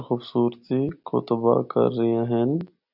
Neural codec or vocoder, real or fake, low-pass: none; real; 5.4 kHz